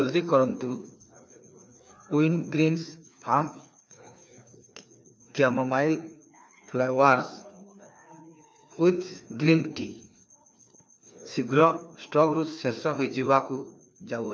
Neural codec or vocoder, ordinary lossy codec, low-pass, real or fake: codec, 16 kHz, 2 kbps, FreqCodec, larger model; none; none; fake